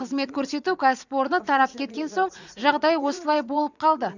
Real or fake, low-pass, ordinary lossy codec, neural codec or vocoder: fake; 7.2 kHz; none; vocoder, 44.1 kHz, 80 mel bands, Vocos